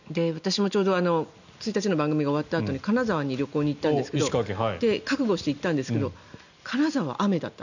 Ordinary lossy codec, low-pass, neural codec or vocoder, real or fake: none; 7.2 kHz; none; real